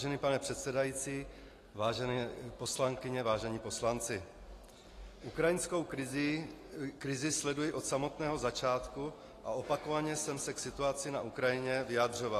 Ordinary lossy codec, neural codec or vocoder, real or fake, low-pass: AAC, 48 kbps; none; real; 14.4 kHz